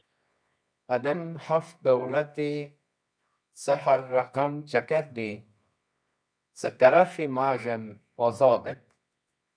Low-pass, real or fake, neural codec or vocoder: 9.9 kHz; fake; codec, 24 kHz, 0.9 kbps, WavTokenizer, medium music audio release